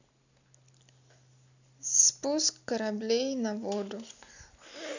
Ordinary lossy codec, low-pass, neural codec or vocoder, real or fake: none; 7.2 kHz; none; real